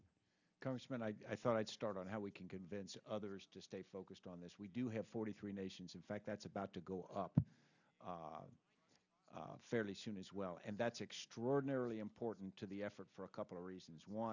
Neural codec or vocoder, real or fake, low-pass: none; real; 7.2 kHz